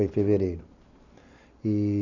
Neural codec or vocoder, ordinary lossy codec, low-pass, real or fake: none; none; 7.2 kHz; real